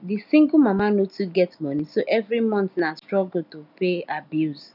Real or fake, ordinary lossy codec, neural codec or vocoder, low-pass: real; none; none; 5.4 kHz